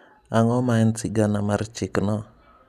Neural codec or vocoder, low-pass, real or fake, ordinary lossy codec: none; 14.4 kHz; real; none